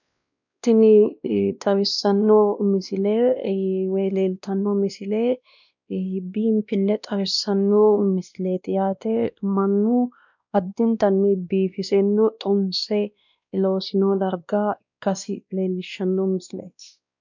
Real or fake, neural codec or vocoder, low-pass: fake; codec, 16 kHz, 1 kbps, X-Codec, WavLM features, trained on Multilingual LibriSpeech; 7.2 kHz